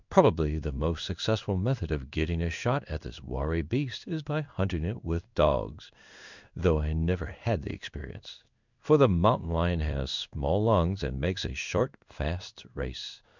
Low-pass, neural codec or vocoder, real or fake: 7.2 kHz; codec, 16 kHz in and 24 kHz out, 1 kbps, XY-Tokenizer; fake